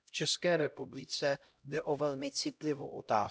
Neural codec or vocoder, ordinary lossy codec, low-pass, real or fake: codec, 16 kHz, 0.5 kbps, X-Codec, HuBERT features, trained on LibriSpeech; none; none; fake